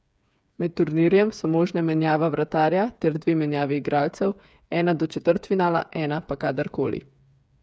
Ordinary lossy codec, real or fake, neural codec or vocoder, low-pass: none; fake; codec, 16 kHz, 8 kbps, FreqCodec, smaller model; none